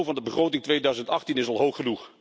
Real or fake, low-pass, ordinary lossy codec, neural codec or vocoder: real; none; none; none